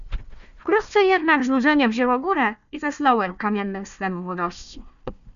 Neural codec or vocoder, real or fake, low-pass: codec, 16 kHz, 1 kbps, FunCodec, trained on Chinese and English, 50 frames a second; fake; 7.2 kHz